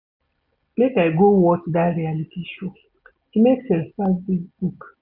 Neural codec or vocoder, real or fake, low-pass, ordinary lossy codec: none; real; 5.4 kHz; none